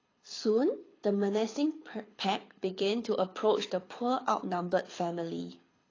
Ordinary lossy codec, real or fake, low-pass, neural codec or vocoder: AAC, 32 kbps; fake; 7.2 kHz; codec, 24 kHz, 6 kbps, HILCodec